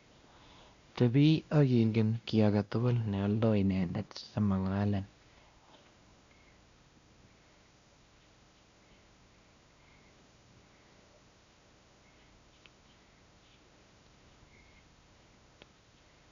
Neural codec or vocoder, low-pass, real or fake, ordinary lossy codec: codec, 16 kHz, 1 kbps, X-Codec, WavLM features, trained on Multilingual LibriSpeech; 7.2 kHz; fake; Opus, 64 kbps